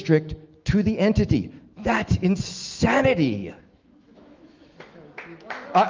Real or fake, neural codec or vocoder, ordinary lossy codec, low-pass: fake; autoencoder, 48 kHz, 128 numbers a frame, DAC-VAE, trained on Japanese speech; Opus, 32 kbps; 7.2 kHz